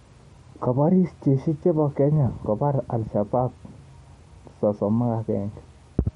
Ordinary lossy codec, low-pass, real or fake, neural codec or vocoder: MP3, 48 kbps; 19.8 kHz; real; none